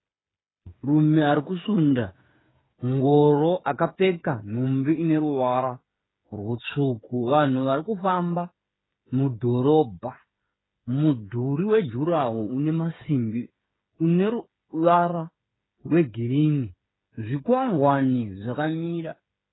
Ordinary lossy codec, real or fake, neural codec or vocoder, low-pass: AAC, 16 kbps; fake; codec, 16 kHz, 8 kbps, FreqCodec, smaller model; 7.2 kHz